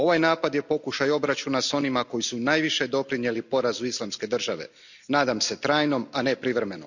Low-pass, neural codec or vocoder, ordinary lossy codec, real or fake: 7.2 kHz; none; none; real